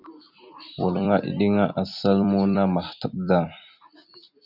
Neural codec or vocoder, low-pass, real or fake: vocoder, 44.1 kHz, 128 mel bands every 256 samples, BigVGAN v2; 5.4 kHz; fake